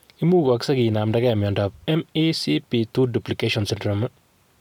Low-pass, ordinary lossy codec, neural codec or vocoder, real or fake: 19.8 kHz; none; none; real